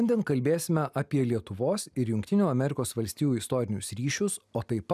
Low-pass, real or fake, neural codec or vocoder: 14.4 kHz; real; none